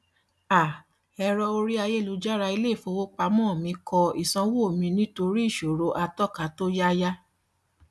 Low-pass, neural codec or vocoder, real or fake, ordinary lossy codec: none; none; real; none